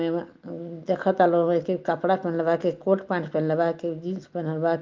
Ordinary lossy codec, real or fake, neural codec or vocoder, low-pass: Opus, 32 kbps; real; none; 7.2 kHz